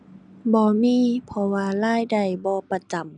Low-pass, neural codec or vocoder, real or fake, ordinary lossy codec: 9.9 kHz; none; real; none